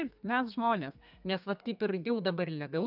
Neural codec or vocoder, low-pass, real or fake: codec, 32 kHz, 1.9 kbps, SNAC; 5.4 kHz; fake